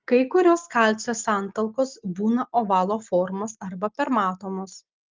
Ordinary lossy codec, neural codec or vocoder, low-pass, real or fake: Opus, 24 kbps; none; 7.2 kHz; real